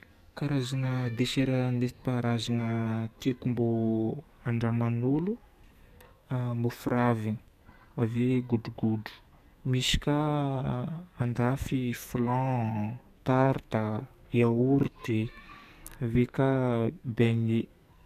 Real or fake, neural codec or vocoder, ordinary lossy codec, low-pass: fake; codec, 44.1 kHz, 2.6 kbps, SNAC; none; 14.4 kHz